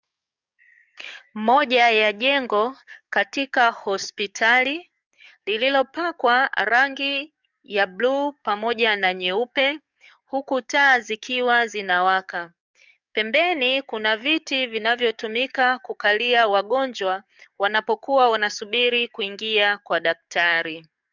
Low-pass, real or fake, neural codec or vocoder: 7.2 kHz; fake; codec, 44.1 kHz, 7.8 kbps, DAC